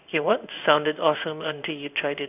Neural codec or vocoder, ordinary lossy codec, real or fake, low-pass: codec, 16 kHz in and 24 kHz out, 1 kbps, XY-Tokenizer; none; fake; 3.6 kHz